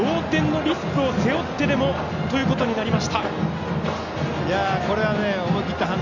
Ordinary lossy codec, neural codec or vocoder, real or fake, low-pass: none; none; real; 7.2 kHz